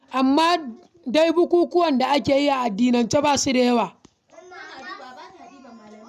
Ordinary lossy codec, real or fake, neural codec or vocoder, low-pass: none; real; none; 14.4 kHz